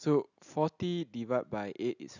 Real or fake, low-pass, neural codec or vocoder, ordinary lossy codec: real; 7.2 kHz; none; none